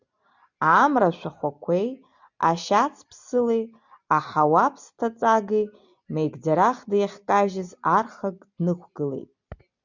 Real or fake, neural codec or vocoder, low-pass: real; none; 7.2 kHz